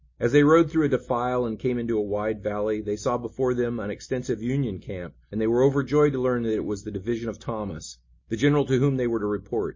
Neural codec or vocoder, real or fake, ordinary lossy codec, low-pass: none; real; MP3, 32 kbps; 7.2 kHz